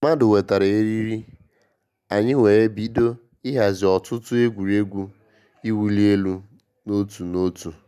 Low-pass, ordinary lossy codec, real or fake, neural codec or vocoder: 14.4 kHz; none; real; none